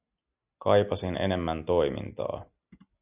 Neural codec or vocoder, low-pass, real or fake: none; 3.6 kHz; real